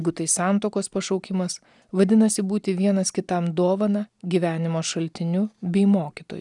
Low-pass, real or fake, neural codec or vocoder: 10.8 kHz; real; none